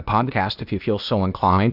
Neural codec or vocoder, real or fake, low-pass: codec, 16 kHz, 0.8 kbps, ZipCodec; fake; 5.4 kHz